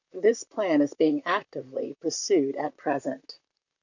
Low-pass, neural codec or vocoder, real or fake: 7.2 kHz; vocoder, 44.1 kHz, 128 mel bands, Pupu-Vocoder; fake